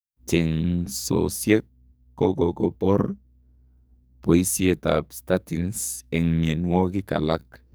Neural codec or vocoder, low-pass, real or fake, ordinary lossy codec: codec, 44.1 kHz, 2.6 kbps, SNAC; none; fake; none